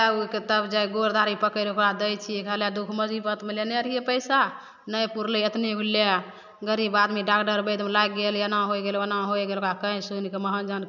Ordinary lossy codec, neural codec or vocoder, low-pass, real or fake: none; none; 7.2 kHz; real